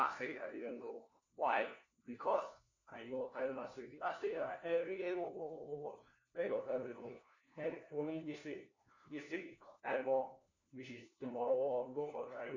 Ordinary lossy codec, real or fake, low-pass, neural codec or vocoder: none; fake; 7.2 kHz; codec, 16 kHz, 1 kbps, FunCodec, trained on LibriTTS, 50 frames a second